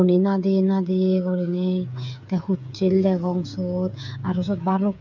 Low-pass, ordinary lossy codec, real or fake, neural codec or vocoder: 7.2 kHz; none; fake; codec, 16 kHz, 8 kbps, FreqCodec, smaller model